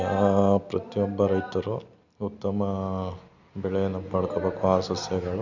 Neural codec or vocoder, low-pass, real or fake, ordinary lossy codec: none; 7.2 kHz; real; none